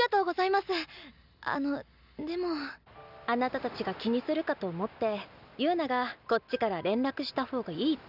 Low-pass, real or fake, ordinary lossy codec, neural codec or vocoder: 5.4 kHz; real; none; none